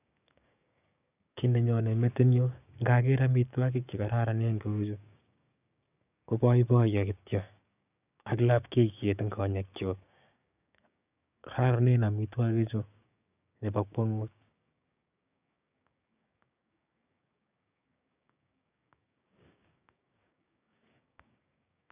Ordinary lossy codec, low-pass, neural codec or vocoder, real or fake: none; 3.6 kHz; codec, 16 kHz, 6 kbps, DAC; fake